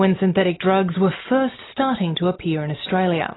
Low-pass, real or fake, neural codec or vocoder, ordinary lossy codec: 7.2 kHz; real; none; AAC, 16 kbps